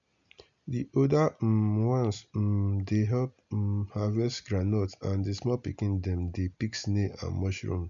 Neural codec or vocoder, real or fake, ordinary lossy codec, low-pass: none; real; MP3, 64 kbps; 7.2 kHz